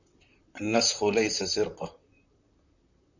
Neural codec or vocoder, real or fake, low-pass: vocoder, 44.1 kHz, 128 mel bands, Pupu-Vocoder; fake; 7.2 kHz